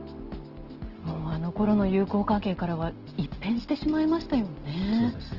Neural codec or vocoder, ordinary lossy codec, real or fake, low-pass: none; Opus, 16 kbps; real; 5.4 kHz